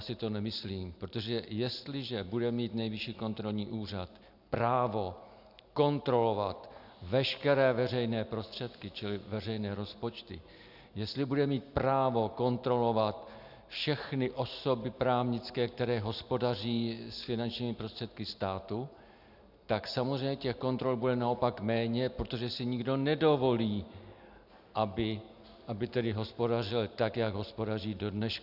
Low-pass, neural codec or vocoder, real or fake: 5.4 kHz; none; real